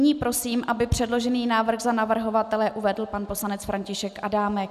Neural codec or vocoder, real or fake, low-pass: none; real; 14.4 kHz